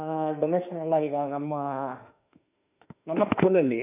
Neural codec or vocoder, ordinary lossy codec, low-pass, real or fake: autoencoder, 48 kHz, 32 numbers a frame, DAC-VAE, trained on Japanese speech; none; 3.6 kHz; fake